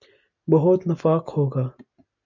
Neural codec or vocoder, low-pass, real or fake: none; 7.2 kHz; real